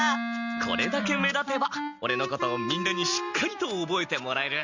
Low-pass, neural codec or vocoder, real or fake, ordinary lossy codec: 7.2 kHz; none; real; Opus, 64 kbps